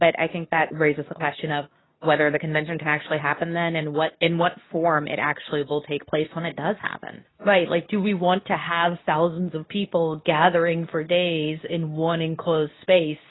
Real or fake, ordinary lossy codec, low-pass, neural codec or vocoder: fake; AAC, 16 kbps; 7.2 kHz; codec, 24 kHz, 0.9 kbps, WavTokenizer, medium speech release version 2